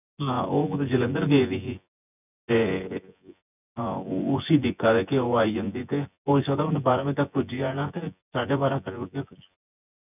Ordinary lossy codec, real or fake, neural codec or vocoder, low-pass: none; fake; vocoder, 24 kHz, 100 mel bands, Vocos; 3.6 kHz